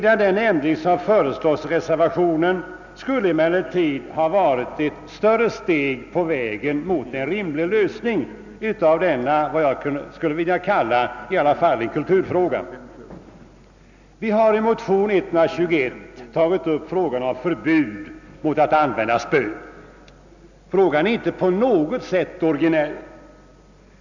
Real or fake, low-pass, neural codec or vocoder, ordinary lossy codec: real; 7.2 kHz; none; none